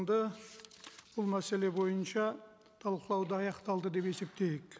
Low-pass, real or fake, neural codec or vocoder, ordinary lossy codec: none; real; none; none